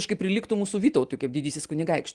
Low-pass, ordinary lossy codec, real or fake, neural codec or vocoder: 10.8 kHz; Opus, 24 kbps; real; none